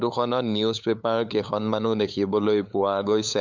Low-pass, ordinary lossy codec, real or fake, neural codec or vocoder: 7.2 kHz; MP3, 64 kbps; fake; codec, 16 kHz, 8 kbps, FunCodec, trained on LibriTTS, 25 frames a second